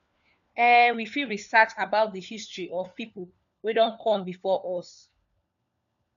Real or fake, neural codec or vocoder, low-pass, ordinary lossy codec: fake; codec, 16 kHz, 4 kbps, FunCodec, trained on LibriTTS, 50 frames a second; 7.2 kHz; none